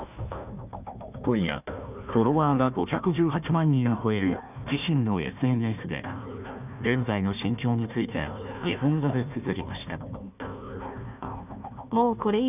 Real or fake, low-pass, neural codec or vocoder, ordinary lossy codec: fake; 3.6 kHz; codec, 16 kHz, 1 kbps, FunCodec, trained on Chinese and English, 50 frames a second; none